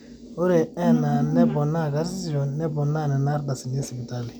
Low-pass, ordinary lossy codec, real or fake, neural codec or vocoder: none; none; real; none